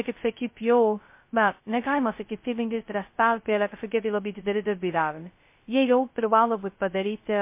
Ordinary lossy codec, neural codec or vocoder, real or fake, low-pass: MP3, 24 kbps; codec, 16 kHz, 0.2 kbps, FocalCodec; fake; 3.6 kHz